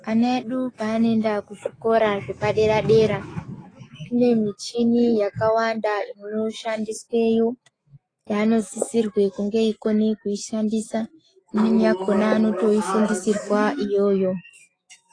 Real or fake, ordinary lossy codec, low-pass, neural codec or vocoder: fake; AAC, 32 kbps; 9.9 kHz; autoencoder, 48 kHz, 128 numbers a frame, DAC-VAE, trained on Japanese speech